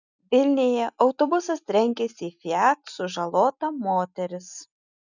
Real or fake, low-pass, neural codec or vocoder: real; 7.2 kHz; none